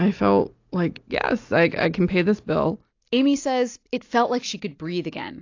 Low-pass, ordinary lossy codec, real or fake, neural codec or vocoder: 7.2 kHz; AAC, 48 kbps; real; none